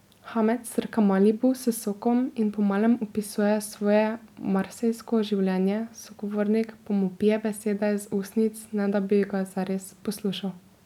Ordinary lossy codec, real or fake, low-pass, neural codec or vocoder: none; real; 19.8 kHz; none